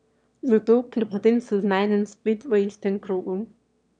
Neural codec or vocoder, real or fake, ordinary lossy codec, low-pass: autoencoder, 22.05 kHz, a latent of 192 numbers a frame, VITS, trained on one speaker; fake; none; 9.9 kHz